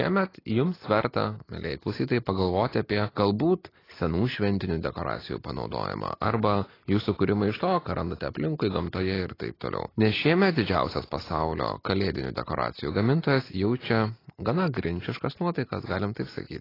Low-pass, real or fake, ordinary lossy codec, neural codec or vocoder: 5.4 kHz; real; AAC, 24 kbps; none